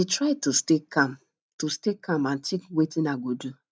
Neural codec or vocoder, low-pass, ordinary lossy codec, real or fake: none; none; none; real